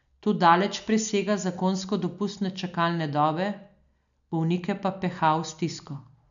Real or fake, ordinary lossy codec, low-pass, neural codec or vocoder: real; none; 7.2 kHz; none